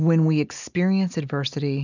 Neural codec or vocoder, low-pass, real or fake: none; 7.2 kHz; real